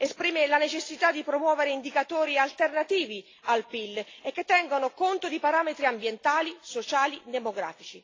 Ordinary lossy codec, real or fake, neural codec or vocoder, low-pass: AAC, 32 kbps; real; none; 7.2 kHz